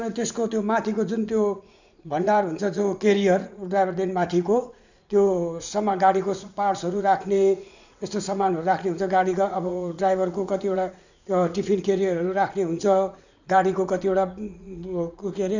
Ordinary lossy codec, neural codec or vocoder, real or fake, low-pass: none; vocoder, 44.1 kHz, 80 mel bands, Vocos; fake; 7.2 kHz